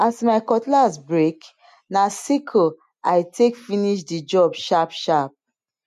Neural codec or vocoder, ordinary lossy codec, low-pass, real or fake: none; MP3, 64 kbps; 14.4 kHz; real